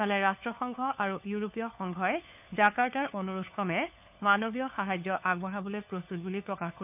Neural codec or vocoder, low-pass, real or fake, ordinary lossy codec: codec, 16 kHz, 4 kbps, FunCodec, trained on LibriTTS, 50 frames a second; 3.6 kHz; fake; none